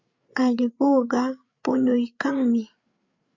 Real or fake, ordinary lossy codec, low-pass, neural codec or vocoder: fake; Opus, 64 kbps; 7.2 kHz; codec, 16 kHz, 8 kbps, FreqCodec, larger model